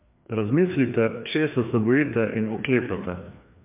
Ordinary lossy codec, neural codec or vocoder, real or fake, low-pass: MP3, 32 kbps; codec, 16 kHz, 2 kbps, FreqCodec, larger model; fake; 3.6 kHz